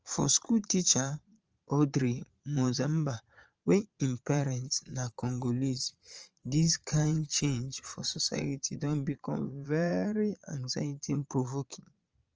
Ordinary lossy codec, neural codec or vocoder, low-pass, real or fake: Opus, 24 kbps; vocoder, 22.05 kHz, 80 mel bands, Vocos; 7.2 kHz; fake